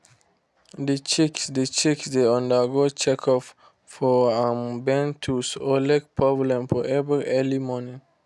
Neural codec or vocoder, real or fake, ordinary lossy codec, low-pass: none; real; none; none